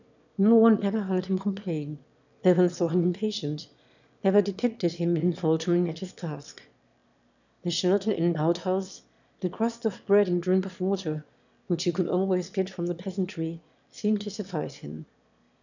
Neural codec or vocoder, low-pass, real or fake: autoencoder, 22.05 kHz, a latent of 192 numbers a frame, VITS, trained on one speaker; 7.2 kHz; fake